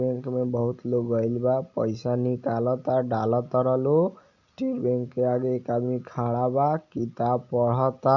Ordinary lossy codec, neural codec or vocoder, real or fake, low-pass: none; none; real; 7.2 kHz